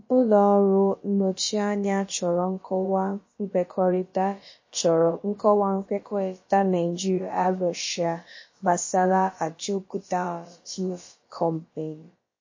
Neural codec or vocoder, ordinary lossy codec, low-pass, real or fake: codec, 16 kHz, about 1 kbps, DyCAST, with the encoder's durations; MP3, 32 kbps; 7.2 kHz; fake